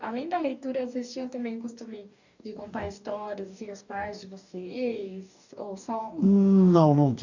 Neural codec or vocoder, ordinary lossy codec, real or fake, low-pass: codec, 44.1 kHz, 2.6 kbps, DAC; none; fake; 7.2 kHz